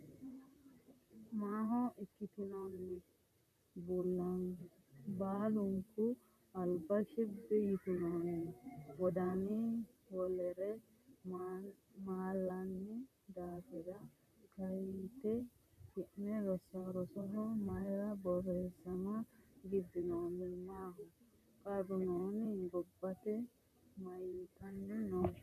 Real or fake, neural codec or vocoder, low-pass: fake; vocoder, 44.1 kHz, 128 mel bands, Pupu-Vocoder; 14.4 kHz